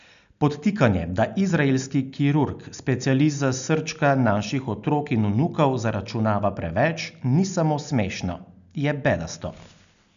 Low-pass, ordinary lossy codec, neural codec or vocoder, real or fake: 7.2 kHz; none; none; real